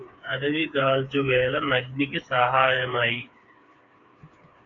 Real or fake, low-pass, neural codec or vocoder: fake; 7.2 kHz; codec, 16 kHz, 4 kbps, FreqCodec, smaller model